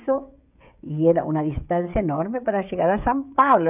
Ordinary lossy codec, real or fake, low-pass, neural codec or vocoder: none; fake; 3.6 kHz; codec, 16 kHz, 16 kbps, FreqCodec, smaller model